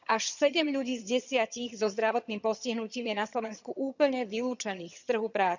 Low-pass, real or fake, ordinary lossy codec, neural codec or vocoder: 7.2 kHz; fake; none; vocoder, 22.05 kHz, 80 mel bands, HiFi-GAN